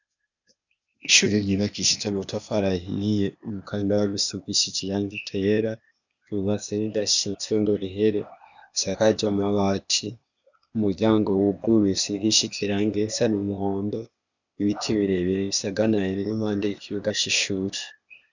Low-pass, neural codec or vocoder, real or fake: 7.2 kHz; codec, 16 kHz, 0.8 kbps, ZipCodec; fake